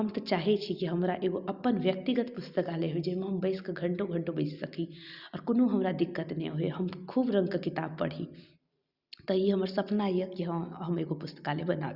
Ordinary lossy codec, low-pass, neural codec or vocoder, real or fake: Opus, 64 kbps; 5.4 kHz; none; real